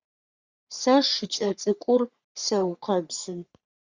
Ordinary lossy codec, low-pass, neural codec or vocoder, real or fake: Opus, 64 kbps; 7.2 kHz; codec, 44.1 kHz, 3.4 kbps, Pupu-Codec; fake